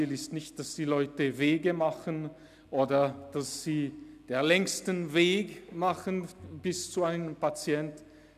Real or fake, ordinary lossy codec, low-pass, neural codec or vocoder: real; none; 14.4 kHz; none